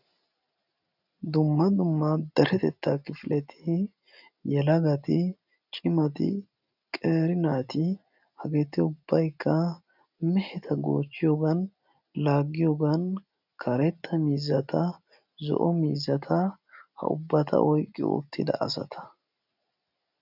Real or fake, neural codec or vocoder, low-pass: real; none; 5.4 kHz